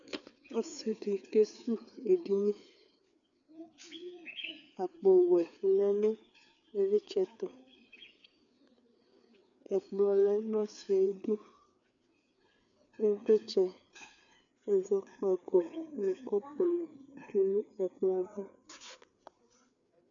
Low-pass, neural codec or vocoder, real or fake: 7.2 kHz; codec, 16 kHz, 4 kbps, FreqCodec, larger model; fake